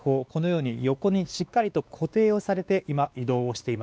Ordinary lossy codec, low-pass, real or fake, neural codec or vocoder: none; none; fake; codec, 16 kHz, 2 kbps, X-Codec, WavLM features, trained on Multilingual LibriSpeech